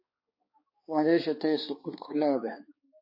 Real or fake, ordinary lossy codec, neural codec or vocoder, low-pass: fake; MP3, 24 kbps; codec, 16 kHz, 2 kbps, X-Codec, HuBERT features, trained on balanced general audio; 5.4 kHz